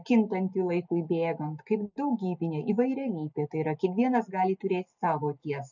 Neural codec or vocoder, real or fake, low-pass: none; real; 7.2 kHz